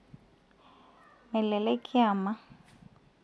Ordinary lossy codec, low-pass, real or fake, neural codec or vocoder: none; none; real; none